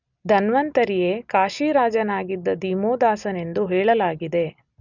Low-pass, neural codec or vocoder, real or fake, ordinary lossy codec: 7.2 kHz; none; real; none